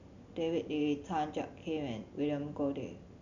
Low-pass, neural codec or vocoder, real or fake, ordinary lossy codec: 7.2 kHz; none; real; none